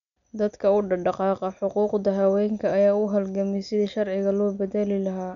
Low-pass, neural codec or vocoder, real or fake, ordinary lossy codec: 7.2 kHz; none; real; none